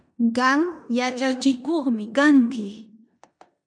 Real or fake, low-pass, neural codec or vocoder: fake; 9.9 kHz; codec, 16 kHz in and 24 kHz out, 0.9 kbps, LongCat-Audio-Codec, four codebook decoder